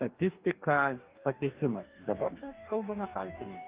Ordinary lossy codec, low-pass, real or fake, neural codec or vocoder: Opus, 32 kbps; 3.6 kHz; fake; codec, 44.1 kHz, 2.6 kbps, DAC